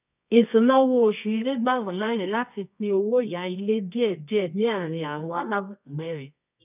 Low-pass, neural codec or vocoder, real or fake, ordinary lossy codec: 3.6 kHz; codec, 24 kHz, 0.9 kbps, WavTokenizer, medium music audio release; fake; none